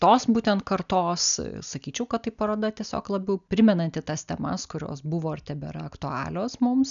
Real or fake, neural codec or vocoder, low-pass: real; none; 7.2 kHz